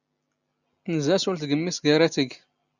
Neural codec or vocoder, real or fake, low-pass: none; real; 7.2 kHz